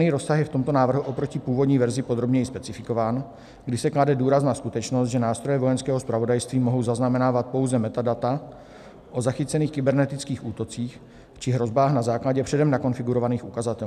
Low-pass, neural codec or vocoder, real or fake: 14.4 kHz; none; real